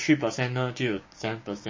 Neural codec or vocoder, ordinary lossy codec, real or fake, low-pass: codec, 16 kHz, 6 kbps, DAC; MP3, 32 kbps; fake; 7.2 kHz